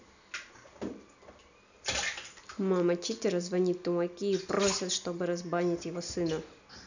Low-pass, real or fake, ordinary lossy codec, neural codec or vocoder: 7.2 kHz; real; none; none